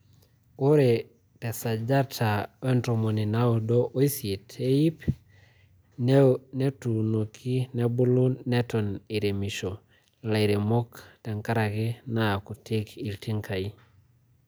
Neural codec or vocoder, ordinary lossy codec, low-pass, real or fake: none; none; none; real